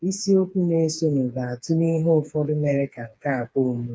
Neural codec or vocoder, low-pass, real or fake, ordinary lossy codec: codec, 16 kHz, 4 kbps, FreqCodec, smaller model; none; fake; none